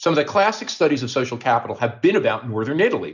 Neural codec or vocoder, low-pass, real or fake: none; 7.2 kHz; real